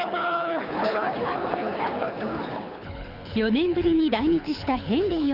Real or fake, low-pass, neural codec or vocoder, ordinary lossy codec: fake; 5.4 kHz; codec, 24 kHz, 6 kbps, HILCodec; none